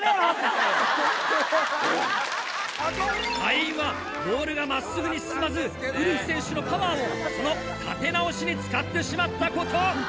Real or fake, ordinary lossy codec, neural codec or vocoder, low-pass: real; none; none; none